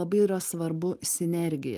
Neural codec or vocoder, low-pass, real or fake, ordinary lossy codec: none; 14.4 kHz; real; Opus, 32 kbps